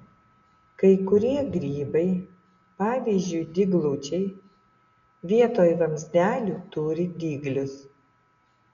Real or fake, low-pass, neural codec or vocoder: real; 7.2 kHz; none